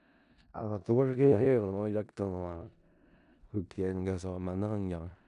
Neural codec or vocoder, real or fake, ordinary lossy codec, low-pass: codec, 16 kHz in and 24 kHz out, 0.4 kbps, LongCat-Audio-Codec, four codebook decoder; fake; none; 10.8 kHz